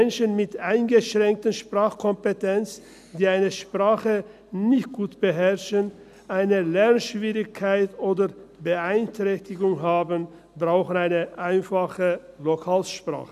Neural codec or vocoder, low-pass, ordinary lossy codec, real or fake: none; 14.4 kHz; none; real